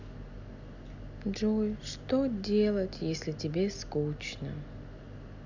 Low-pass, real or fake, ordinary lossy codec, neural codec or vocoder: 7.2 kHz; real; none; none